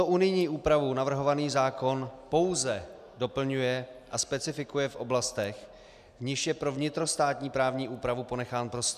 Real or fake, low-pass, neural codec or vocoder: real; 14.4 kHz; none